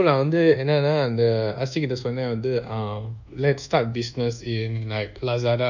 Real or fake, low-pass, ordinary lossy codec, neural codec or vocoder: fake; 7.2 kHz; none; codec, 24 kHz, 1.2 kbps, DualCodec